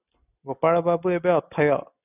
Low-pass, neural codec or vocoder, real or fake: 3.6 kHz; none; real